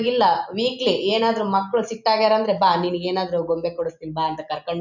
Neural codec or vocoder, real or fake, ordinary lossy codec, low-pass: none; real; none; 7.2 kHz